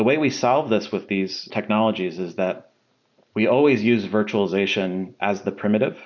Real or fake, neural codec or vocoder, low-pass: real; none; 7.2 kHz